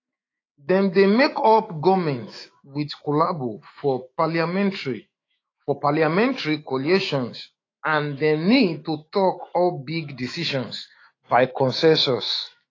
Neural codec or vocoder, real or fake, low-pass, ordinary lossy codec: autoencoder, 48 kHz, 128 numbers a frame, DAC-VAE, trained on Japanese speech; fake; 7.2 kHz; AAC, 32 kbps